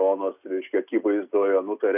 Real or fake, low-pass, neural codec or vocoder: real; 3.6 kHz; none